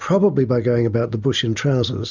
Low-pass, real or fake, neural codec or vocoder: 7.2 kHz; real; none